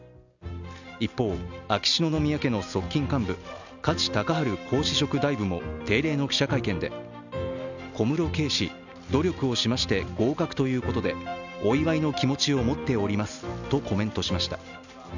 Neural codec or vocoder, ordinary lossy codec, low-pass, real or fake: none; none; 7.2 kHz; real